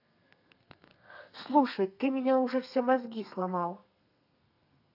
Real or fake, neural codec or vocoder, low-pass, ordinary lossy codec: fake; codec, 32 kHz, 1.9 kbps, SNAC; 5.4 kHz; AAC, 48 kbps